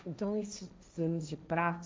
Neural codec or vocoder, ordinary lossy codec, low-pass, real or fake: codec, 16 kHz, 1.1 kbps, Voila-Tokenizer; none; none; fake